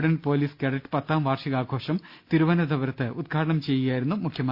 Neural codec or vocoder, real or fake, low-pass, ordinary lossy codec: none; real; 5.4 kHz; Opus, 64 kbps